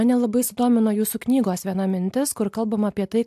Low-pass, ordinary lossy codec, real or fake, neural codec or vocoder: 14.4 kHz; AAC, 96 kbps; real; none